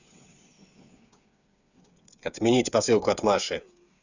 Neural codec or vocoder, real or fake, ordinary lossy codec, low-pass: codec, 16 kHz, 8 kbps, FreqCodec, smaller model; fake; none; 7.2 kHz